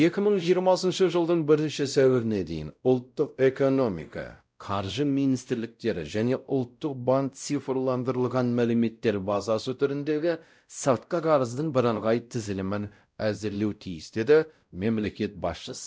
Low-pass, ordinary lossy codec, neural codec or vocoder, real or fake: none; none; codec, 16 kHz, 0.5 kbps, X-Codec, WavLM features, trained on Multilingual LibriSpeech; fake